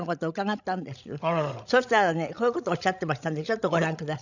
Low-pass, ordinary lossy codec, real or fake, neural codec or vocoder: 7.2 kHz; none; fake; codec, 16 kHz, 16 kbps, FreqCodec, larger model